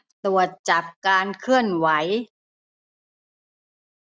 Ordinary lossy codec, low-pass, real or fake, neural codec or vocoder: none; none; real; none